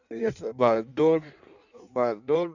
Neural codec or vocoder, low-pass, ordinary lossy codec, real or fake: codec, 16 kHz in and 24 kHz out, 1.1 kbps, FireRedTTS-2 codec; 7.2 kHz; none; fake